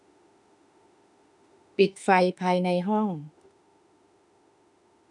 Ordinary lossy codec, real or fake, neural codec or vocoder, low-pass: none; fake; autoencoder, 48 kHz, 32 numbers a frame, DAC-VAE, trained on Japanese speech; 10.8 kHz